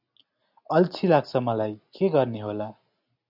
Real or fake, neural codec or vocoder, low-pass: real; none; 5.4 kHz